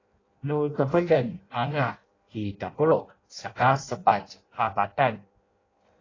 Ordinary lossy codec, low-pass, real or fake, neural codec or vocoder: AAC, 32 kbps; 7.2 kHz; fake; codec, 16 kHz in and 24 kHz out, 0.6 kbps, FireRedTTS-2 codec